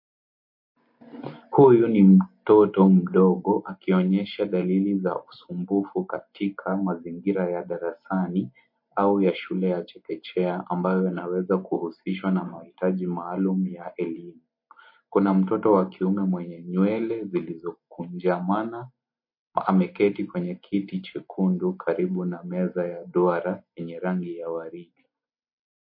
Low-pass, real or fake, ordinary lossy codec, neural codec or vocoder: 5.4 kHz; real; MP3, 32 kbps; none